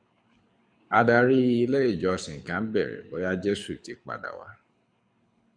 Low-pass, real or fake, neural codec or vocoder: 9.9 kHz; fake; codec, 24 kHz, 6 kbps, HILCodec